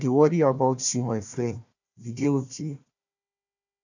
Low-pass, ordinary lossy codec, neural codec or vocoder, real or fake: 7.2 kHz; none; codec, 16 kHz, 1 kbps, FunCodec, trained on Chinese and English, 50 frames a second; fake